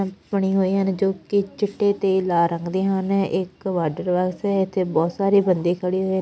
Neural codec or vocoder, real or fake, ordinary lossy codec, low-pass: none; real; none; none